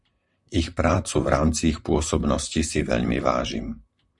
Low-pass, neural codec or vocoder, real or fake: 10.8 kHz; vocoder, 44.1 kHz, 128 mel bands, Pupu-Vocoder; fake